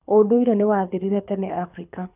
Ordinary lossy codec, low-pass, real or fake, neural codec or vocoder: none; 3.6 kHz; fake; codec, 24 kHz, 6 kbps, HILCodec